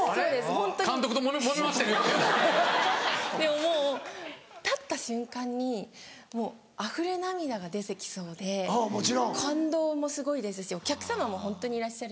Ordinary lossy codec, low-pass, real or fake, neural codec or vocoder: none; none; real; none